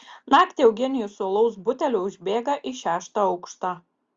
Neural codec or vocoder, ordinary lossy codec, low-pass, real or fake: none; Opus, 32 kbps; 7.2 kHz; real